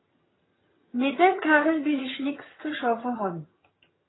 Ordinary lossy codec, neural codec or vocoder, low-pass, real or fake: AAC, 16 kbps; vocoder, 22.05 kHz, 80 mel bands, HiFi-GAN; 7.2 kHz; fake